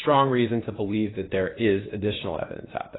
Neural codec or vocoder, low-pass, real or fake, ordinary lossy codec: codec, 16 kHz, 0.8 kbps, ZipCodec; 7.2 kHz; fake; AAC, 16 kbps